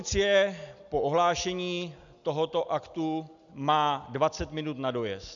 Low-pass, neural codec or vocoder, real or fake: 7.2 kHz; none; real